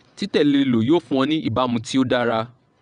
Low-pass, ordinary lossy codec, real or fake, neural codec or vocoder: 9.9 kHz; none; fake; vocoder, 22.05 kHz, 80 mel bands, WaveNeXt